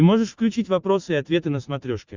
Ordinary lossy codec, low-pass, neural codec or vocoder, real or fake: Opus, 64 kbps; 7.2 kHz; none; real